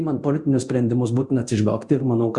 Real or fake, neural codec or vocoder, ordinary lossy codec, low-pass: fake; codec, 24 kHz, 0.9 kbps, DualCodec; Opus, 64 kbps; 10.8 kHz